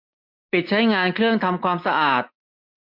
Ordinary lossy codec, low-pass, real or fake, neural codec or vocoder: MP3, 48 kbps; 5.4 kHz; real; none